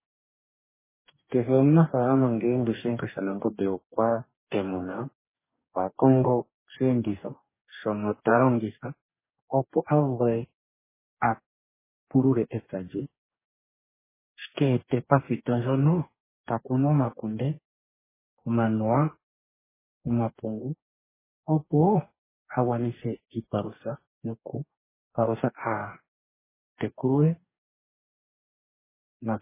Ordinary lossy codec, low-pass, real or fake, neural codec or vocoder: MP3, 16 kbps; 3.6 kHz; fake; codec, 44.1 kHz, 2.6 kbps, DAC